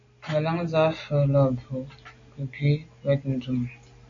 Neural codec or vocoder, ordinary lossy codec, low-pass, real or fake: none; AAC, 48 kbps; 7.2 kHz; real